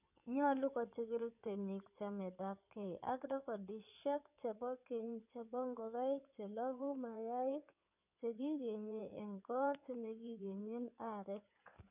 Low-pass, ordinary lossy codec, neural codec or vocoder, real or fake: 3.6 kHz; none; codec, 16 kHz in and 24 kHz out, 2.2 kbps, FireRedTTS-2 codec; fake